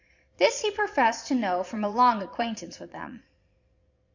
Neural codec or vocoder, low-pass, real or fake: vocoder, 22.05 kHz, 80 mel bands, Vocos; 7.2 kHz; fake